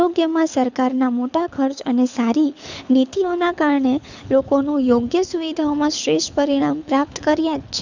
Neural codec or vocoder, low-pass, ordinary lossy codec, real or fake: codec, 24 kHz, 6 kbps, HILCodec; 7.2 kHz; none; fake